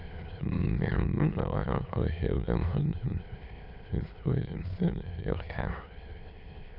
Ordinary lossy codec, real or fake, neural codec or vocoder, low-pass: none; fake; autoencoder, 22.05 kHz, a latent of 192 numbers a frame, VITS, trained on many speakers; 5.4 kHz